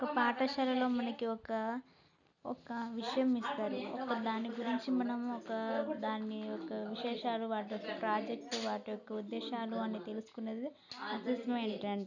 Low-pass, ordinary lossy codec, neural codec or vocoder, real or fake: 7.2 kHz; none; none; real